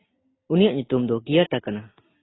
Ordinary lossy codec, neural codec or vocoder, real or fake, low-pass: AAC, 16 kbps; none; real; 7.2 kHz